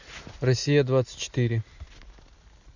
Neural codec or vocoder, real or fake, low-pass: none; real; 7.2 kHz